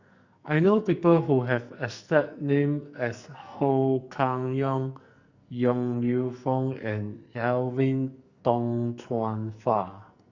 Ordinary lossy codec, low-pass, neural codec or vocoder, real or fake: Opus, 64 kbps; 7.2 kHz; codec, 44.1 kHz, 2.6 kbps, SNAC; fake